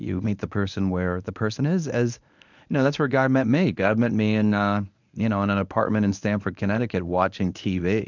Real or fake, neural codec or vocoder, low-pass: fake; codec, 24 kHz, 0.9 kbps, WavTokenizer, medium speech release version 1; 7.2 kHz